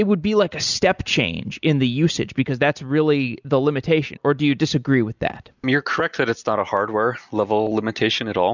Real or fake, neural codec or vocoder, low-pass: real; none; 7.2 kHz